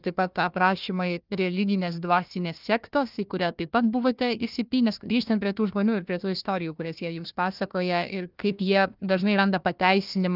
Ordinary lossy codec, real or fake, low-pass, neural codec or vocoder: Opus, 24 kbps; fake; 5.4 kHz; codec, 16 kHz, 1 kbps, FunCodec, trained on Chinese and English, 50 frames a second